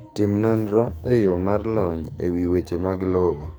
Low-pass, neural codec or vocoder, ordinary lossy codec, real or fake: none; codec, 44.1 kHz, 2.6 kbps, SNAC; none; fake